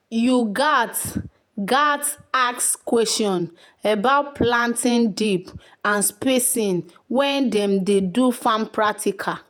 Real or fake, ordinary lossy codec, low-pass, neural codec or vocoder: fake; none; none; vocoder, 48 kHz, 128 mel bands, Vocos